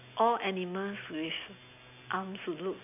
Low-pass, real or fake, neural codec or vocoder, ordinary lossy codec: 3.6 kHz; real; none; AAC, 32 kbps